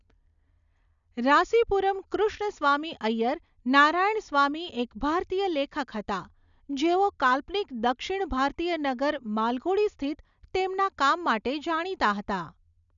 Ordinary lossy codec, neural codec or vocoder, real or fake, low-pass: none; none; real; 7.2 kHz